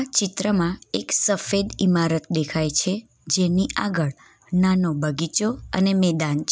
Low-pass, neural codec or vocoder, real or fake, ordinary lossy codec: none; none; real; none